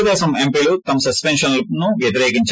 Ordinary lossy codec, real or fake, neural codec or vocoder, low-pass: none; real; none; none